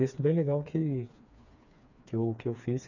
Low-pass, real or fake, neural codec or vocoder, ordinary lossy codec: 7.2 kHz; fake; codec, 16 kHz, 4 kbps, FreqCodec, smaller model; none